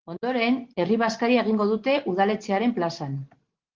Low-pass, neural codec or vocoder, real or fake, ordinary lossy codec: 7.2 kHz; none; real; Opus, 16 kbps